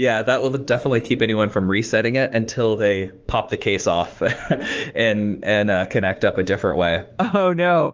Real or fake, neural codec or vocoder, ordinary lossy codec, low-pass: fake; autoencoder, 48 kHz, 32 numbers a frame, DAC-VAE, trained on Japanese speech; Opus, 24 kbps; 7.2 kHz